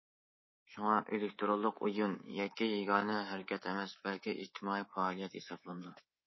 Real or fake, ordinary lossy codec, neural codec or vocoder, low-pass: fake; MP3, 24 kbps; codec, 24 kHz, 3.1 kbps, DualCodec; 7.2 kHz